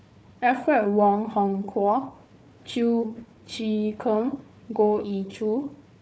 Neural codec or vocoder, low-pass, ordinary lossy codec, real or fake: codec, 16 kHz, 4 kbps, FunCodec, trained on Chinese and English, 50 frames a second; none; none; fake